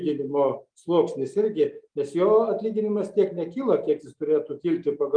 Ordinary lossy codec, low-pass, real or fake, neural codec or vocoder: Opus, 32 kbps; 9.9 kHz; real; none